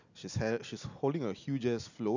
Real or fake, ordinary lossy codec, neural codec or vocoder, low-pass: real; none; none; 7.2 kHz